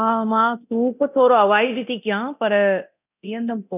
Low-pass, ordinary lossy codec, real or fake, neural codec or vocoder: 3.6 kHz; none; fake; codec, 24 kHz, 0.9 kbps, DualCodec